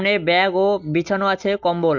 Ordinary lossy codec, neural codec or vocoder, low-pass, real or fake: none; none; 7.2 kHz; real